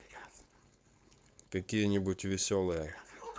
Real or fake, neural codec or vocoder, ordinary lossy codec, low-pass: fake; codec, 16 kHz, 4.8 kbps, FACodec; none; none